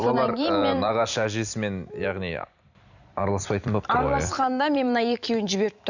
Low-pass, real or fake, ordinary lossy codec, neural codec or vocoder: 7.2 kHz; fake; none; vocoder, 44.1 kHz, 128 mel bands every 256 samples, BigVGAN v2